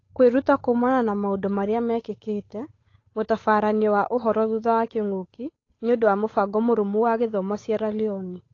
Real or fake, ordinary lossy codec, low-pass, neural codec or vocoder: fake; AAC, 32 kbps; 7.2 kHz; codec, 16 kHz, 8 kbps, FunCodec, trained on Chinese and English, 25 frames a second